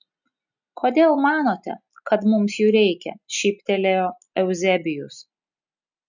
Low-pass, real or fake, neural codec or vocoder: 7.2 kHz; real; none